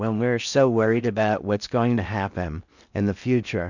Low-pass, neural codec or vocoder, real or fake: 7.2 kHz; codec, 16 kHz in and 24 kHz out, 0.6 kbps, FocalCodec, streaming, 4096 codes; fake